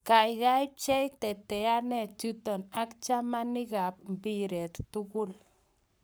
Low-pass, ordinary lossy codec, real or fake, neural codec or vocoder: none; none; fake; codec, 44.1 kHz, 7.8 kbps, Pupu-Codec